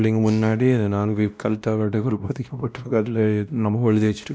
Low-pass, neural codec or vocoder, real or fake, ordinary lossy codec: none; codec, 16 kHz, 1 kbps, X-Codec, WavLM features, trained on Multilingual LibriSpeech; fake; none